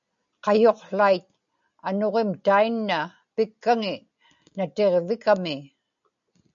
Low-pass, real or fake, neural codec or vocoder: 7.2 kHz; real; none